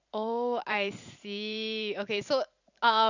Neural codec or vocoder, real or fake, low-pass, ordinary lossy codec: vocoder, 44.1 kHz, 128 mel bands every 512 samples, BigVGAN v2; fake; 7.2 kHz; none